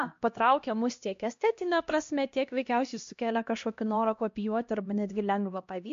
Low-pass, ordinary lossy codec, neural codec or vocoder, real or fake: 7.2 kHz; MP3, 48 kbps; codec, 16 kHz, 1 kbps, X-Codec, HuBERT features, trained on LibriSpeech; fake